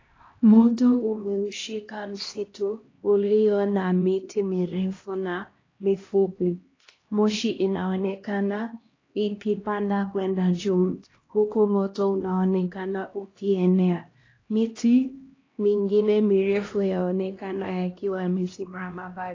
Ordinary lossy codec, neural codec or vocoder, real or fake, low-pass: AAC, 32 kbps; codec, 16 kHz, 1 kbps, X-Codec, HuBERT features, trained on LibriSpeech; fake; 7.2 kHz